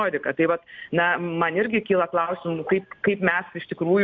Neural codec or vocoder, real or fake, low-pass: none; real; 7.2 kHz